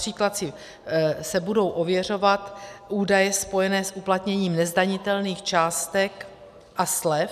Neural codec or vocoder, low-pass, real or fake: none; 14.4 kHz; real